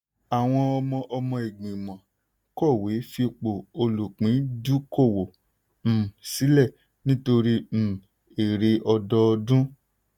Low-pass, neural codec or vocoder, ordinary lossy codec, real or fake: 19.8 kHz; none; none; real